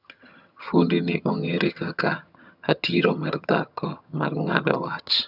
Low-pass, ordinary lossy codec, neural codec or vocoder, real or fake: 5.4 kHz; none; vocoder, 22.05 kHz, 80 mel bands, HiFi-GAN; fake